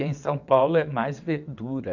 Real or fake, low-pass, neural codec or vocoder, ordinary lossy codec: fake; 7.2 kHz; vocoder, 22.05 kHz, 80 mel bands, WaveNeXt; none